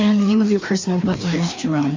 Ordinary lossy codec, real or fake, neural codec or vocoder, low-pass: MP3, 64 kbps; fake; codec, 16 kHz, 2 kbps, FreqCodec, larger model; 7.2 kHz